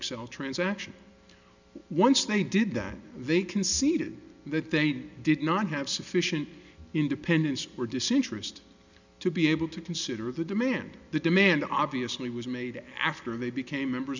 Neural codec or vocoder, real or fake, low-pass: none; real; 7.2 kHz